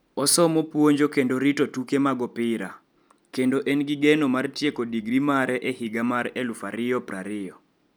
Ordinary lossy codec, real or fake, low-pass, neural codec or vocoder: none; real; none; none